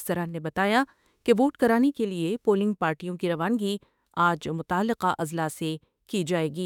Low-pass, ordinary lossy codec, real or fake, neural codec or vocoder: 19.8 kHz; none; fake; autoencoder, 48 kHz, 32 numbers a frame, DAC-VAE, trained on Japanese speech